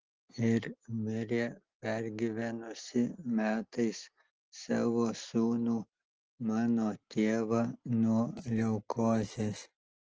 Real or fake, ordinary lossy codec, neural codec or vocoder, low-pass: real; Opus, 16 kbps; none; 7.2 kHz